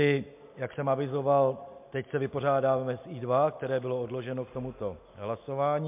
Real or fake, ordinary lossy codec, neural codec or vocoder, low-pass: real; MP3, 32 kbps; none; 3.6 kHz